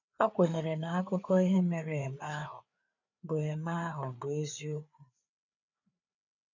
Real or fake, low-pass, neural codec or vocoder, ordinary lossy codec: fake; 7.2 kHz; codec, 16 kHz, 4 kbps, FreqCodec, larger model; none